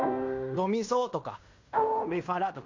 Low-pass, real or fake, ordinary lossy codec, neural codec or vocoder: 7.2 kHz; fake; MP3, 48 kbps; codec, 16 kHz in and 24 kHz out, 0.9 kbps, LongCat-Audio-Codec, fine tuned four codebook decoder